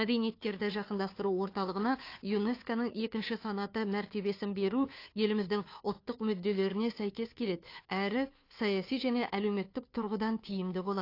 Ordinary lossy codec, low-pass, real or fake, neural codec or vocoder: AAC, 32 kbps; 5.4 kHz; fake; codec, 16 kHz, 2 kbps, FunCodec, trained on Chinese and English, 25 frames a second